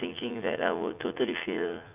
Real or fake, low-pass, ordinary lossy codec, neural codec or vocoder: fake; 3.6 kHz; none; vocoder, 44.1 kHz, 80 mel bands, Vocos